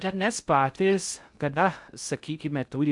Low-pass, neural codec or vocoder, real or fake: 10.8 kHz; codec, 16 kHz in and 24 kHz out, 0.6 kbps, FocalCodec, streaming, 2048 codes; fake